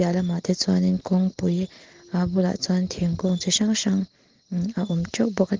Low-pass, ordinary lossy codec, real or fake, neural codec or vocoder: 7.2 kHz; Opus, 16 kbps; real; none